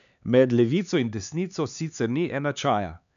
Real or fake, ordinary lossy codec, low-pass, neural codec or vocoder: fake; MP3, 96 kbps; 7.2 kHz; codec, 16 kHz, 4 kbps, X-Codec, HuBERT features, trained on LibriSpeech